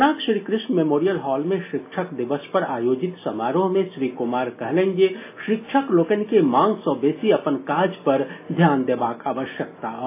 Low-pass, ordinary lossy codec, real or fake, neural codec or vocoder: 3.6 kHz; AAC, 24 kbps; real; none